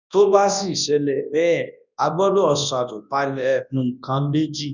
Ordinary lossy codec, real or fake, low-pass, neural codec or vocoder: none; fake; 7.2 kHz; codec, 24 kHz, 0.9 kbps, WavTokenizer, large speech release